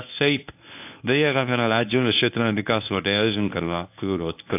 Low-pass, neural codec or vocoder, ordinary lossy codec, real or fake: 3.6 kHz; codec, 24 kHz, 0.9 kbps, WavTokenizer, medium speech release version 1; AAC, 32 kbps; fake